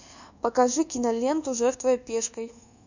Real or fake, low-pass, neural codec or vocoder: fake; 7.2 kHz; codec, 24 kHz, 1.2 kbps, DualCodec